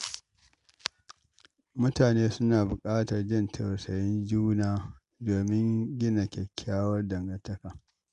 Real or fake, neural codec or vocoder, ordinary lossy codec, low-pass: real; none; MP3, 64 kbps; 10.8 kHz